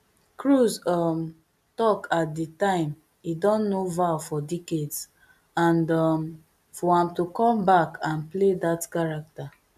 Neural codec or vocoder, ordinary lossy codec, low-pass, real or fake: none; none; 14.4 kHz; real